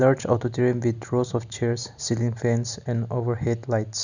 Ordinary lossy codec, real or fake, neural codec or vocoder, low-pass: none; real; none; 7.2 kHz